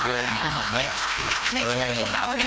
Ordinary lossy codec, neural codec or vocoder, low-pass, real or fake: none; codec, 16 kHz, 1 kbps, FreqCodec, larger model; none; fake